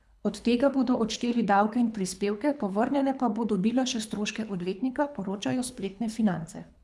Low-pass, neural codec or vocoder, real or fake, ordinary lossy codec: none; codec, 24 kHz, 3 kbps, HILCodec; fake; none